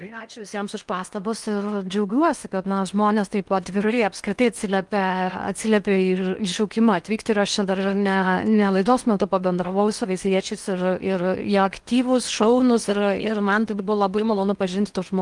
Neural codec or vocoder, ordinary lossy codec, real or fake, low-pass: codec, 16 kHz in and 24 kHz out, 0.8 kbps, FocalCodec, streaming, 65536 codes; Opus, 32 kbps; fake; 10.8 kHz